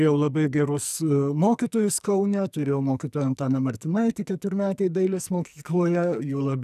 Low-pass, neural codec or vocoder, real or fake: 14.4 kHz; codec, 44.1 kHz, 2.6 kbps, SNAC; fake